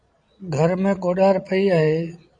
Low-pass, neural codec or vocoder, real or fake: 9.9 kHz; vocoder, 22.05 kHz, 80 mel bands, Vocos; fake